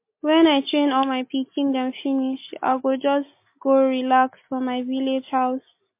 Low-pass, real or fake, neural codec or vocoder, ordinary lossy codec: 3.6 kHz; real; none; MP3, 24 kbps